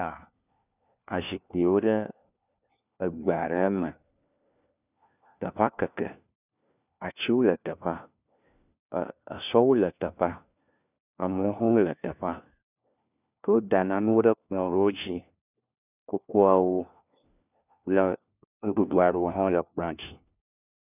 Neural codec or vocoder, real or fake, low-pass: codec, 16 kHz, 1 kbps, FunCodec, trained on LibriTTS, 50 frames a second; fake; 3.6 kHz